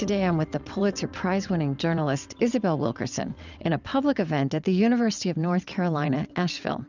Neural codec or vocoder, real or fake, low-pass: vocoder, 22.05 kHz, 80 mel bands, WaveNeXt; fake; 7.2 kHz